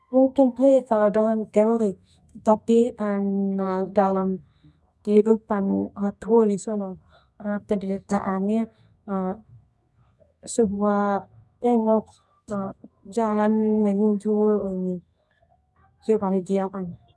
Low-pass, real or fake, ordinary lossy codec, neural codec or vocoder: none; fake; none; codec, 24 kHz, 0.9 kbps, WavTokenizer, medium music audio release